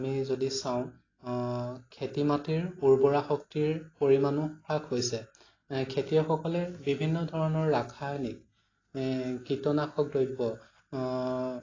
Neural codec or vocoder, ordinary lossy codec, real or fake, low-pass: none; AAC, 32 kbps; real; 7.2 kHz